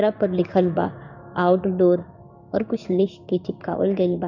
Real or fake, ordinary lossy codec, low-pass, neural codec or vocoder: fake; none; 7.2 kHz; codec, 16 kHz in and 24 kHz out, 1 kbps, XY-Tokenizer